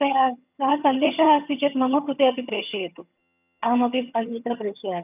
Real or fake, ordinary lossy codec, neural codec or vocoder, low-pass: fake; none; vocoder, 22.05 kHz, 80 mel bands, HiFi-GAN; 3.6 kHz